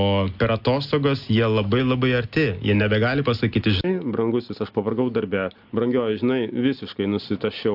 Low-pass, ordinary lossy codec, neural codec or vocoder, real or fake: 5.4 kHz; AAC, 48 kbps; none; real